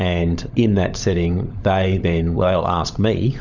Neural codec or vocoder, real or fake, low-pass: codec, 16 kHz, 16 kbps, FunCodec, trained on LibriTTS, 50 frames a second; fake; 7.2 kHz